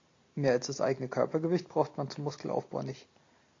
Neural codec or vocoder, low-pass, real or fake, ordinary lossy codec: none; 7.2 kHz; real; AAC, 32 kbps